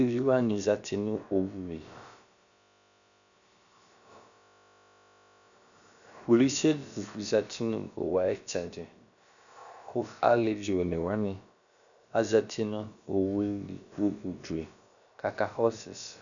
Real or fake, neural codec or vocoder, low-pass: fake; codec, 16 kHz, about 1 kbps, DyCAST, with the encoder's durations; 7.2 kHz